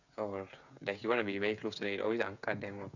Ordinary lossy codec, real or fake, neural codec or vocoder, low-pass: none; fake; codec, 16 kHz, 8 kbps, FreqCodec, smaller model; 7.2 kHz